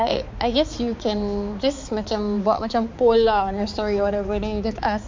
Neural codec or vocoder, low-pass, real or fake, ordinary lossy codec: codec, 16 kHz, 4 kbps, X-Codec, HuBERT features, trained on balanced general audio; 7.2 kHz; fake; MP3, 48 kbps